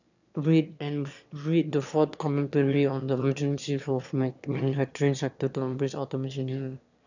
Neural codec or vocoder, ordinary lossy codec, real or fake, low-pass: autoencoder, 22.05 kHz, a latent of 192 numbers a frame, VITS, trained on one speaker; none; fake; 7.2 kHz